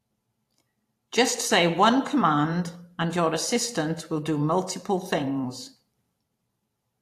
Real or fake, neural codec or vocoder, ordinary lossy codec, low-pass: fake; vocoder, 44.1 kHz, 128 mel bands every 512 samples, BigVGAN v2; AAC, 64 kbps; 14.4 kHz